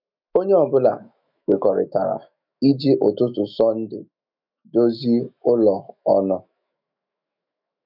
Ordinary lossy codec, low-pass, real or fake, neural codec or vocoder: none; 5.4 kHz; fake; autoencoder, 48 kHz, 128 numbers a frame, DAC-VAE, trained on Japanese speech